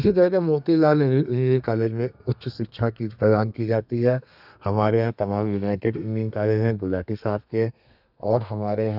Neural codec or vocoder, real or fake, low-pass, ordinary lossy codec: codec, 32 kHz, 1.9 kbps, SNAC; fake; 5.4 kHz; none